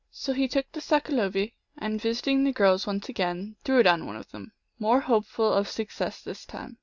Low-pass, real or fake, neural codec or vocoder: 7.2 kHz; real; none